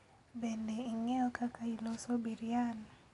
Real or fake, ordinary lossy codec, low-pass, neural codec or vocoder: real; none; 10.8 kHz; none